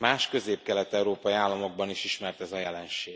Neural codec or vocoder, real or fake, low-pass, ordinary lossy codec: none; real; none; none